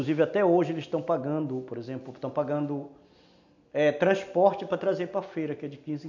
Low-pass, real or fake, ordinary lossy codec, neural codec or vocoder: 7.2 kHz; real; none; none